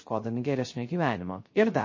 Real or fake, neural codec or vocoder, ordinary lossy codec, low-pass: fake; codec, 16 kHz, 0.3 kbps, FocalCodec; MP3, 32 kbps; 7.2 kHz